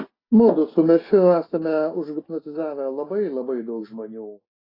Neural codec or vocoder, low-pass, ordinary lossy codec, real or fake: none; 5.4 kHz; AAC, 24 kbps; real